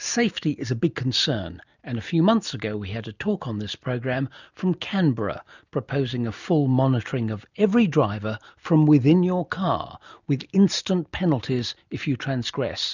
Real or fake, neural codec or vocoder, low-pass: real; none; 7.2 kHz